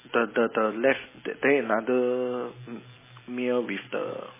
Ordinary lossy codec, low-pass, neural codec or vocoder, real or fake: MP3, 16 kbps; 3.6 kHz; none; real